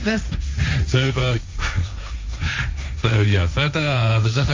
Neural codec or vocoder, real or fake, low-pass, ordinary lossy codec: codec, 16 kHz, 1.1 kbps, Voila-Tokenizer; fake; 7.2 kHz; MP3, 64 kbps